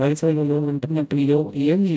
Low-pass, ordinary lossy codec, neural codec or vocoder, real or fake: none; none; codec, 16 kHz, 0.5 kbps, FreqCodec, smaller model; fake